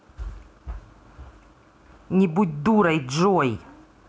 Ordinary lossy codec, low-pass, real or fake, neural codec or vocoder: none; none; real; none